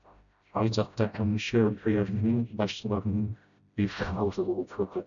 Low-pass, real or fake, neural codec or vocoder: 7.2 kHz; fake; codec, 16 kHz, 0.5 kbps, FreqCodec, smaller model